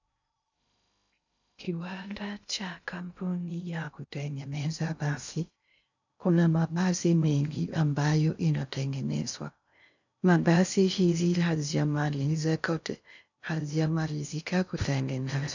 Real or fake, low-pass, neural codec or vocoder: fake; 7.2 kHz; codec, 16 kHz in and 24 kHz out, 0.6 kbps, FocalCodec, streaming, 2048 codes